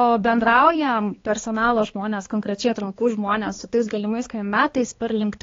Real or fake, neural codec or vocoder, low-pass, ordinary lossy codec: fake; codec, 16 kHz, 2 kbps, X-Codec, HuBERT features, trained on balanced general audio; 7.2 kHz; AAC, 32 kbps